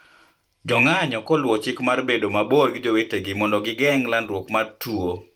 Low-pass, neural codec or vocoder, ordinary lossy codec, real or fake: 19.8 kHz; vocoder, 44.1 kHz, 128 mel bands every 512 samples, BigVGAN v2; Opus, 32 kbps; fake